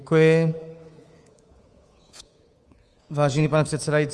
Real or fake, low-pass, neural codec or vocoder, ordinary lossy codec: fake; 10.8 kHz; codec, 24 kHz, 3.1 kbps, DualCodec; Opus, 24 kbps